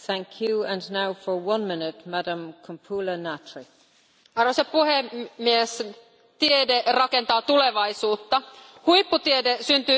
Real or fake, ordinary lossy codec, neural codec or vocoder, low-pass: real; none; none; none